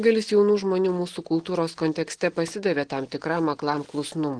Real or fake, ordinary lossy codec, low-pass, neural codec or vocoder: real; Opus, 16 kbps; 9.9 kHz; none